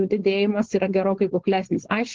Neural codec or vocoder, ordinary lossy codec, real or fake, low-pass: codec, 16 kHz, 4.8 kbps, FACodec; Opus, 16 kbps; fake; 7.2 kHz